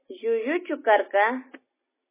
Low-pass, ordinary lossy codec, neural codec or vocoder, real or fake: 3.6 kHz; MP3, 16 kbps; none; real